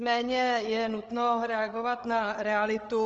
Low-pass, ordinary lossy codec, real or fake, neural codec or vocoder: 7.2 kHz; Opus, 24 kbps; fake; codec, 16 kHz, 8 kbps, FreqCodec, larger model